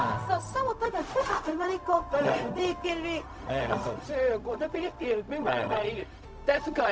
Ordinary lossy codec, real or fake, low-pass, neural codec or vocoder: none; fake; none; codec, 16 kHz, 0.4 kbps, LongCat-Audio-Codec